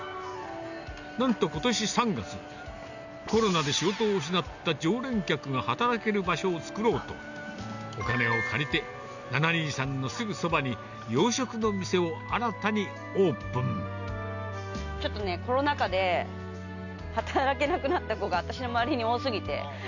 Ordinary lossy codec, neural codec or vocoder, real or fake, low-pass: none; none; real; 7.2 kHz